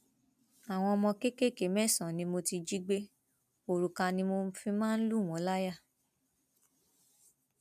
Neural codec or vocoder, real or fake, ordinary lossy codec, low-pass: none; real; none; 14.4 kHz